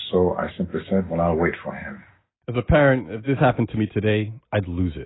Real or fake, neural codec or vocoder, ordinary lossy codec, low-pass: real; none; AAC, 16 kbps; 7.2 kHz